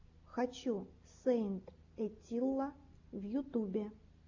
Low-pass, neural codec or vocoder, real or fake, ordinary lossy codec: 7.2 kHz; none; real; AAC, 48 kbps